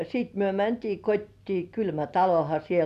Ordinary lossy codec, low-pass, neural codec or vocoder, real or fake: none; 14.4 kHz; none; real